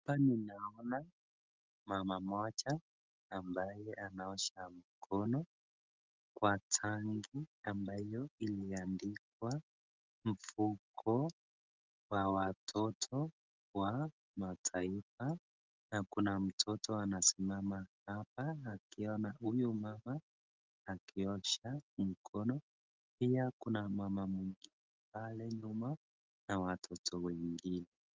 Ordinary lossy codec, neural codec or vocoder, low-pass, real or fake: Opus, 32 kbps; none; 7.2 kHz; real